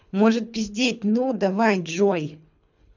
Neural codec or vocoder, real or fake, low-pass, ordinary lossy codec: codec, 24 kHz, 3 kbps, HILCodec; fake; 7.2 kHz; none